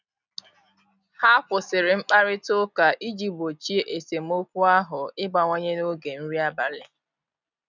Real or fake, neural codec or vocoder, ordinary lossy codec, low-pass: real; none; none; 7.2 kHz